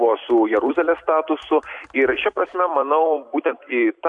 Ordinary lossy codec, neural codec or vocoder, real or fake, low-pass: AAC, 64 kbps; vocoder, 44.1 kHz, 128 mel bands every 256 samples, BigVGAN v2; fake; 10.8 kHz